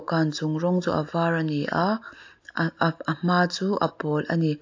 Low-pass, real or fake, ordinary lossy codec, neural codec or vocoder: 7.2 kHz; real; AAC, 48 kbps; none